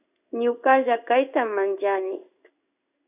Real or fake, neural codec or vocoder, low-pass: fake; codec, 16 kHz in and 24 kHz out, 1 kbps, XY-Tokenizer; 3.6 kHz